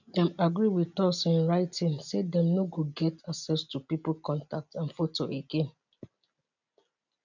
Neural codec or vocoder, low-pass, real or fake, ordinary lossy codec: none; 7.2 kHz; real; none